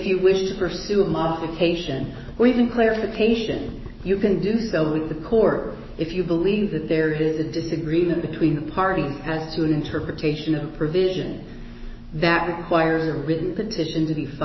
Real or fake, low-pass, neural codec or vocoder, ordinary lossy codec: fake; 7.2 kHz; autoencoder, 48 kHz, 128 numbers a frame, DAC-VAE, trained on Japanese speech; MP3, 24 kbps